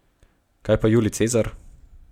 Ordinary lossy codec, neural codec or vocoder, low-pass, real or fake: MP3, 96 kbps; none; 19.8 kHz; real